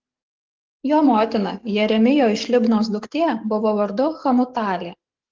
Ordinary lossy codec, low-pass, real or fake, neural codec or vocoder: Opus, 16 kbps; 7.2 kHz; fake; codec, 44.1 kHz, 7.8 kbps, DAC